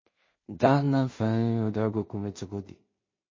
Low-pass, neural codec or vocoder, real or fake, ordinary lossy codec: 7.2 kHz; codec, 16 kHz in and 24 kHz out, 0.4 kbps, LongCat-Audio-Codec, two codebook decoder; fake; MP3, 32 kbps